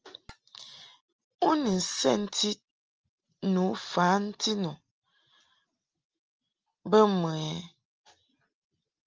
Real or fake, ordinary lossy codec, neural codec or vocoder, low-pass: real; Opus, 24 kbps; none; 7.2 kHz